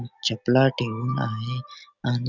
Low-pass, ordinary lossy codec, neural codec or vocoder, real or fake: 7.2 kHz; none; none; real